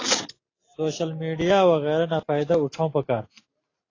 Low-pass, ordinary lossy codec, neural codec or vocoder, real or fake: 7.2 kHz; AAC, 32 kbps; none; real